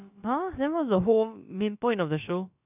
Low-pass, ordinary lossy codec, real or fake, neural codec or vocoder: 3.6 kHz; none; fake; codec, 16 kHz, about 1 kbps, DyCAST, with the encoder's durations